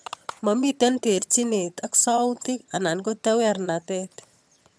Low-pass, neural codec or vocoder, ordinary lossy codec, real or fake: none; vocoder, 22.05 kHz, 80 mel bands, HiFi-GAN; none; fake